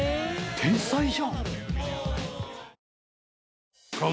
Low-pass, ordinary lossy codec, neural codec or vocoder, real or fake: none; none; none; real